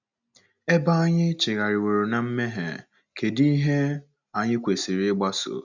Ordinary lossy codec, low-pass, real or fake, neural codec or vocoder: none; 7.2 kHz; real; none